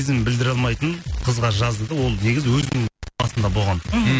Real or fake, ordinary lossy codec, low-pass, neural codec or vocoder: real; none; none; none